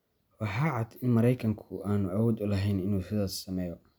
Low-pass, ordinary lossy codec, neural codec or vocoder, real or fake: none; none; none; real